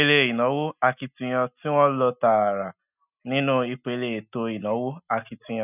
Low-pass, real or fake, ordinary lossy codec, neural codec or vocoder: 3.6 kHz; real; none; none